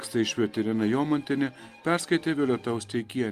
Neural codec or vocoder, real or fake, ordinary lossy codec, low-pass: none; real; Opus, 32 kbps; 14.4 kHz